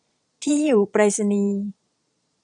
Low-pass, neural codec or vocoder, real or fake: 9.9 kHz; vocoder, 22.05 kHz, 80 mel bands, Vocos; fake